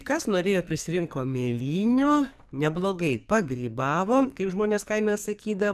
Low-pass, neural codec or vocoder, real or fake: 14.4 kHz; codec, 44.1 kHz, 2.6 kbps, SNAC; fake